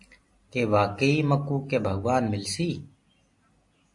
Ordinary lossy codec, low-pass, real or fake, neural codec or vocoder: MP3, 48 kbps; 10.8 kHz; real; none